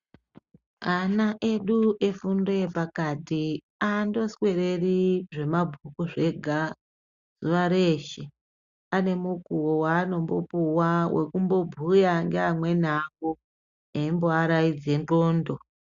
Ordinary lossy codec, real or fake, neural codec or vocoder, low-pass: Opus, 64 kbps; real; none; 7.2 kHz